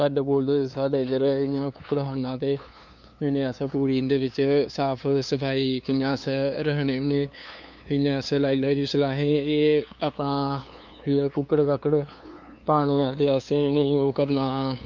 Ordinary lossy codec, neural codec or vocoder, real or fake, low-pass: MP3, 64 kbps; codec, 16 kHz, 2 kbps, FunCodec, trained on LibriTTS, 25 frames a second; fake; 7.2 kHz